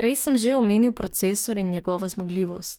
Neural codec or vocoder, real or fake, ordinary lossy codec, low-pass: codec, 44.1 kHz, 2.6 kbps, DAC; fake; none; none